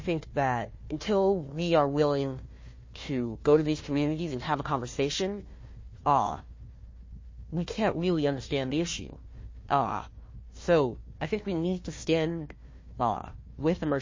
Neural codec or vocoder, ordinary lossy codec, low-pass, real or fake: codec, 16 kHz, 1 kbps, FunCodec, trained on Chinese and English, 50 frames a second; MP3, 32 kbps; 7.2 kHz; fake